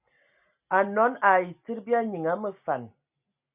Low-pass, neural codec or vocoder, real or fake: 3.6 kHz; none; real